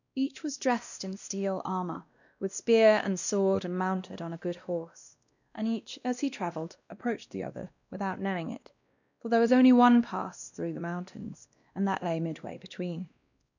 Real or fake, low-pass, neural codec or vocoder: fake; 7.2 kHz; codec, 16 kHz, 1 kbps, X-Codec, WavLM features, trained on Multilingual LibriSpeech